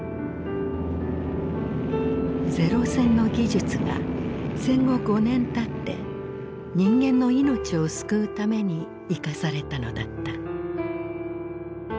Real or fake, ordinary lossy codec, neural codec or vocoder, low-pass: real; none; none; none